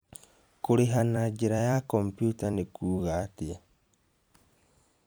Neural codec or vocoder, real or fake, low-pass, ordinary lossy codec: vocoder, 44.1 kHz, 128 mel bands every 256 samples, BigVGAN v2; fake; none; none